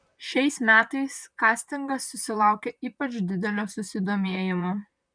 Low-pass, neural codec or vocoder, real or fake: 9.9 kHz; vocoder, 44.1 kHz, 128 mel bands, Pupu-Vocoder; fake